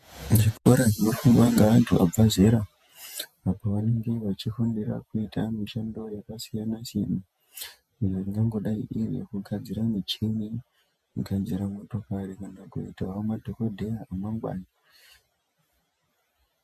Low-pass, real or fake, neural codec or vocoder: 14.4 kHz; fake; vocoder, 48 kHz, 128 mel bands, Vocos